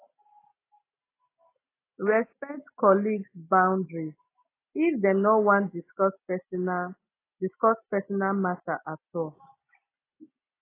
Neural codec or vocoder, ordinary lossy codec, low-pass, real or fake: none; AAC, 24 kbps; 3.6 kHz; real